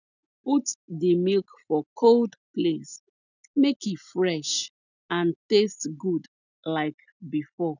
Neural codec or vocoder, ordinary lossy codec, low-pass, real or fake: none; none; 7.2 kHz; real